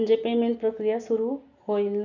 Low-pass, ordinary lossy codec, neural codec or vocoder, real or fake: 7.2 kHz; none; none; real